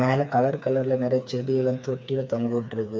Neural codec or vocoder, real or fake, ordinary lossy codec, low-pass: codec, 16 kHz, 4 kbps, FreqCodec, smaller model; fake; none; none